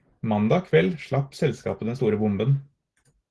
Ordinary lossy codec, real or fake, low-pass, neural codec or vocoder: Opus, 16 kbps; real; 10.8 kHz; none